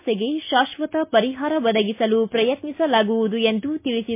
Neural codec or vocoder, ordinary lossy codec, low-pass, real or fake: none; MP3, 24 kbps; 3.6 kHz; real